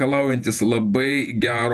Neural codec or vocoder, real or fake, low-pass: vocoder, 48 kHz, 128 mel bands, Vocos; fake; 14.4 kHz